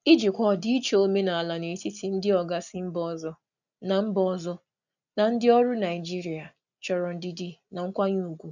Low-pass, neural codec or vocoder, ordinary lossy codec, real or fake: 7.2 kHz; none; none; real